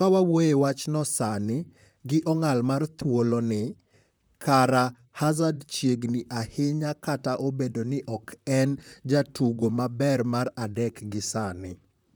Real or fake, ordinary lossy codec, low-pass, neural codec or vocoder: fake; none; none; vocoder, 44.1 kHz, 128 mel bands, Pupu-Vocoder